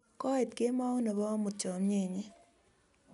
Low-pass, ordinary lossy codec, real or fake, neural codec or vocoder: 10.8 kHz; none; real; none